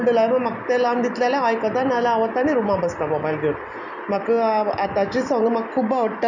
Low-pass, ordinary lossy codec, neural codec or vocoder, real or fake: 7.2 kHz; none; none; real